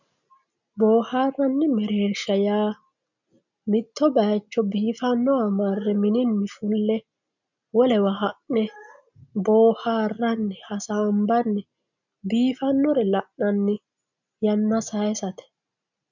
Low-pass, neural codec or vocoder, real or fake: 7.2 kHz; none; real